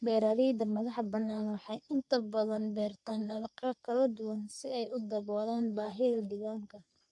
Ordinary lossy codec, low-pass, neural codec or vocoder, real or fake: AAC, 64 kbps; 10.8 kHz; codec, 44.1 kHz, 3.4 kbps, Pupu-Codec; fake